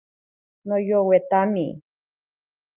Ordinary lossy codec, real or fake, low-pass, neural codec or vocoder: Opus, 32 kbps; real; 3.6 kHz; none